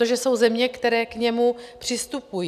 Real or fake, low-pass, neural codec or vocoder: real; 14.4 kHz; none